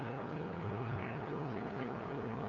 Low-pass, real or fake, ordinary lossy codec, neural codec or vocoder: 7.2 kHz; fake; none; codec, 16 kHz, 2 kbps, FunCodec, trained on LibriTTS, 25 frames a second